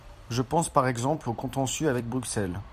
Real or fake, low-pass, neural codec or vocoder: fake; 14.4 kHz; vocoder, 44.1 kHz, 128 mel bands every 256 samples, BigVGAN v2